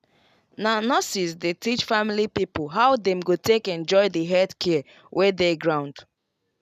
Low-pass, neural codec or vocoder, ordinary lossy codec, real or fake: 14.4 kHz; none; none; real